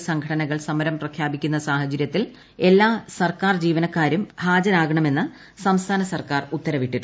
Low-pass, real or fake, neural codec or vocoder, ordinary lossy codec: none; real; none; none